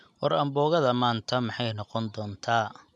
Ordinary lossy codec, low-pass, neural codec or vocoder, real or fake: none; none; none; real